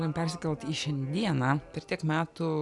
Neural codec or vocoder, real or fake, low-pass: none; real; 10.8 kHz